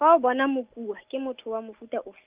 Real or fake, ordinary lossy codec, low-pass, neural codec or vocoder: real; Opus, 24 kbps; 3.6 kHz; none